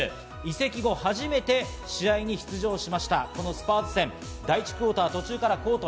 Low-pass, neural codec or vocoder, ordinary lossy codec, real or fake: none; none; none; real